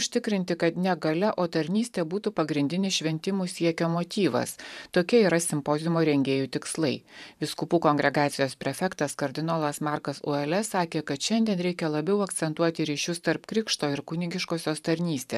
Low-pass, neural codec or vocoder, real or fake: 14.4 kHz; vocoder, 44.1 kHz, 128 mel bands every 512 samples, BigVGAN v2; fake